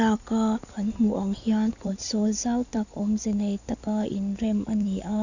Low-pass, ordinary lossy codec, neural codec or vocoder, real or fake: 7.2 kHz; none; codec, 16 kHz in and 24 kHz out, 2.2 kbps, FireRedTTS-2 codec; fake